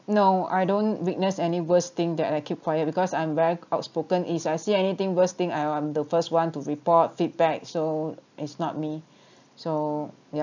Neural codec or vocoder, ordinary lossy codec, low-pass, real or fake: none; none; 7.2 kHz; real